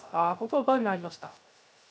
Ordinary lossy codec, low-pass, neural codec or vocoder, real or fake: none; none; codec, 16 kHz, 0.3 kbps, FocalCodec; fake